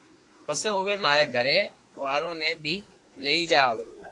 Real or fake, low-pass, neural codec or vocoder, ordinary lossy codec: fake; 10.8 kHz; codec, 24 kHz, 1 kbps, SNAC; AAC, 48 kbps